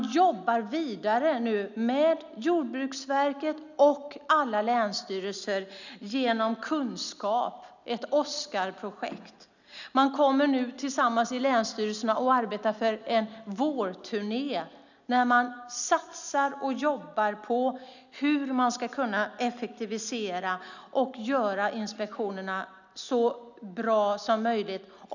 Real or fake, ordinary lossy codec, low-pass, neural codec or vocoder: real; none; 7.2 kHz; none